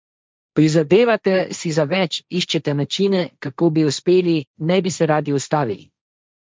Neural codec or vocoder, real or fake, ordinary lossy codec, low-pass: codec, 16 kHz, 1.1 kbps, Voila-Tokenizer; fake; none; none